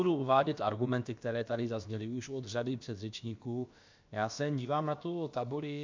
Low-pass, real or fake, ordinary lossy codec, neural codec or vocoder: 7.2 kHz; fake; MP3, 64 kbps; codec, 16 kHz, about 1 kbps, DyCAST, with the encoder's durations